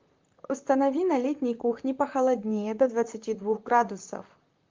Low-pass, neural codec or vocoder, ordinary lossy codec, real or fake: 7.2 kHz; vocoder, 44.1 kHz, 128 mel bands, Pupu-Vocoder; Opus, 24 kbps; fake